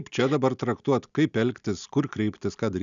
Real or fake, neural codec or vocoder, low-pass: real; none; 7.2 kHz